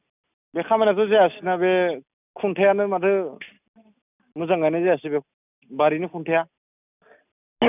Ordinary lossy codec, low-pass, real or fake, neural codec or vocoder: none; 3.6 kHz; real; none